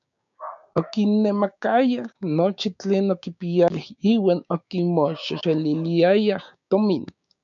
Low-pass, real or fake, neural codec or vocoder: 7.2 kHz; fake; codec, 16 kHz, 6 kbps, DAC